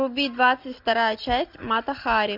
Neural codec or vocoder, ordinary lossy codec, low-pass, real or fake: none; MP3, 48 kbps; 5.4 kHz; real